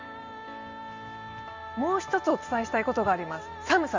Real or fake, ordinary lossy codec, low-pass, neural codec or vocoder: real; Opus, 32 kbps; 7.2 kHz; none